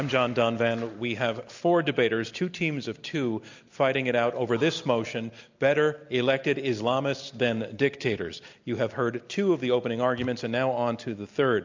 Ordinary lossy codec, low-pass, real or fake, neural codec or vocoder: MP3, 64 kbps; 7.2 kHz; real; none